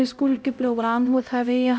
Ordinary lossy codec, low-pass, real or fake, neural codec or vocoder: none; none; fake; codec, 16 kHz, 0.5 kbps, X-Codec, HuBERT features, trained on LibriSpeech